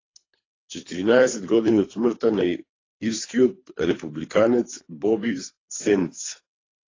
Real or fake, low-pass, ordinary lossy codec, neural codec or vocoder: fake; 7.2 kHz; AAC, 32 kbps; codec, 24 kHz, 3 kbps, HILCodec